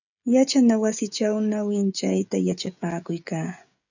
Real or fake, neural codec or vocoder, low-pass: fake; codec, 16 kHz, 16 kbps, FreqCodec, smaller model; 7.2 kHz